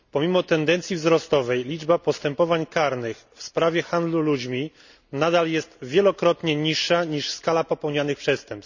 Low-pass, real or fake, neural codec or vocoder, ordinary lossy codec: 7.2 kHz; real; none; none